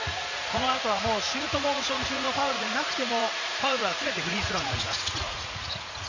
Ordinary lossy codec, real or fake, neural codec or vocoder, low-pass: Opus, 64 kbps; fake; vocoder, 44.1 kHz, 80 mel bands, Vocos; 7.2 kHz